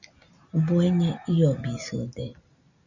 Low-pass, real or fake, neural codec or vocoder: 7.2 kHz; real; none